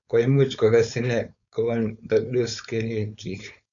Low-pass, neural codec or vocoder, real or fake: 7.2 kHz; codec, 16 kHz, 4.8 kbps, FACodec; fake